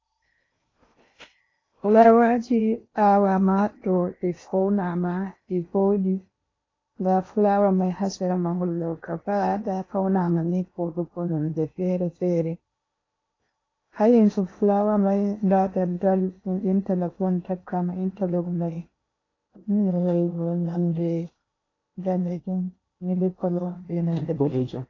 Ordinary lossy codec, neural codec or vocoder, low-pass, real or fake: AAC, 32 kbps; codec, 16 kHz in and 24 kHz out, 0.8 kbps, FocalCodec, streaming, 65536 codes; 7.2 kHz; fake